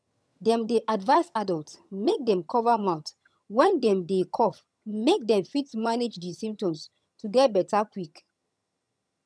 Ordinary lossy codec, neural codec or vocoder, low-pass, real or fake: none; vocoder, 22.05 kHz, 80 mel bands, HiFi-GAN; none; fake